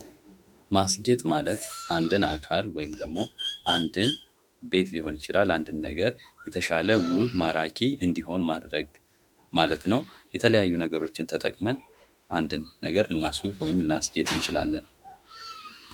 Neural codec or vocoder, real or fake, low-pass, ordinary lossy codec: autoencoder, 48 kHz, 32 numbers a frame, DAC-VAE, trained on Japanese speech; fake; 19.8 kHz; MP3, 96 kbps